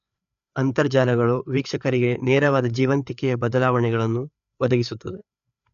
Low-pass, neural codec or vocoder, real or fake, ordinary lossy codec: 7.2 kHz; codec, 16 kHz, 4 kbps, FreqCodec, larger model; fake; none